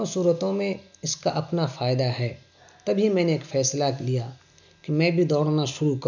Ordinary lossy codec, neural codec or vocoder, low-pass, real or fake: none; none; 7.2 kHz; real